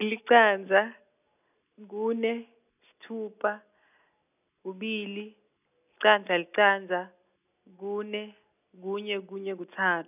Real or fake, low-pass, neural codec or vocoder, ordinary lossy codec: real; 3.6 kHz; none; none